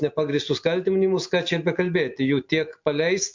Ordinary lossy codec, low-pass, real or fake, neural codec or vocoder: MP3, 48 kbps; 7.2 kHz; real; none